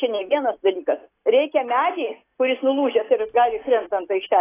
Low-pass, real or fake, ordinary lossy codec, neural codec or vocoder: 3.6 kHz; real; AAC, 16 kbps; none